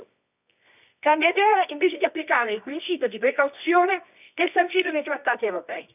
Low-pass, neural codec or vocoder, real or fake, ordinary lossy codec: 3.6 kHz; codec, 24 kHz, 0.9 kbps, WavTokenizer, medium music audio release; fake; none